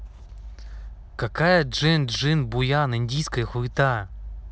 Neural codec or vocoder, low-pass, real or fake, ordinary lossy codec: none; none; real; none